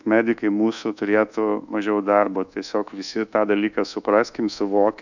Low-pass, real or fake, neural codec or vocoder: 7.2 kHz; fake; codec, 24 kHz, 1.2 kbps, DualCodec